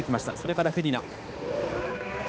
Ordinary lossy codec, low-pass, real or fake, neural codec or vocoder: none; none; fake; codec, 16 kHz, 2 kbps, X-Codec, HuBERT features, trained on balanced general audio